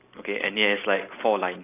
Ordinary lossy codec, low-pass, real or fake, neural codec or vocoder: AAC, 24 kbps; 3.6 kHz; real; none